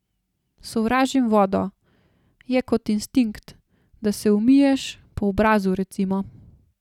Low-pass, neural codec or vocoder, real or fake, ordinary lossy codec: 19.8 kHz; none; real; none